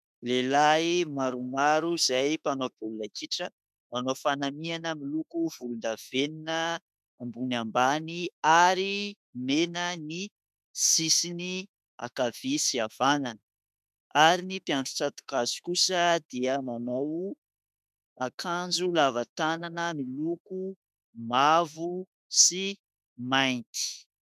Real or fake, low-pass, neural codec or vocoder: fake; 14.4 kHz; autoencoder, 48 kHz, 32 numbers a frame, DAC-VAE, trained on Japanese speech